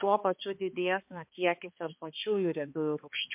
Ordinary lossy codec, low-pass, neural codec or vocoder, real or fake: MP3, 32 kbps; 3.6 kHz; codec, 16 kHz, 2 kbps, X-Codec, HuBERT features, trained on balanced general audio; fake